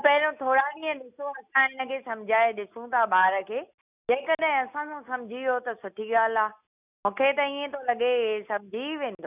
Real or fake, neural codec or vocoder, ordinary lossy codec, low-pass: real; none; none; 3.6 kHz